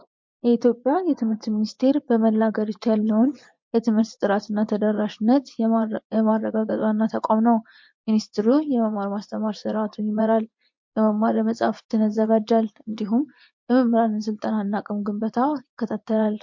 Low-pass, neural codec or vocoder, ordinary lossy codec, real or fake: 7.2 kHz; vocoder, 24 kHz, 100 mel bands, Vocos; MP3, 48 kbps; fake